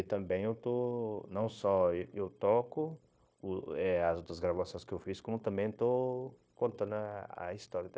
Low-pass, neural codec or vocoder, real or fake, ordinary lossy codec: none; codec, 16 kHz, 0.9 kbps, LongCat-Audio-Codec; fake; none